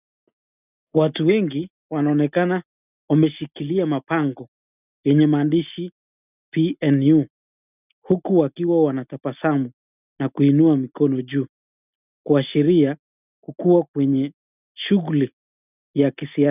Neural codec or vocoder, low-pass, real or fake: none; 3.6 kHz; real